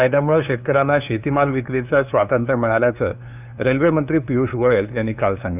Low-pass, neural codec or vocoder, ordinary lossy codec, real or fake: 3.6 kHz; codec, 16 kHz, 2 kbps, FunCodec, trained on LibriTTS, 25 frames a second; none; fake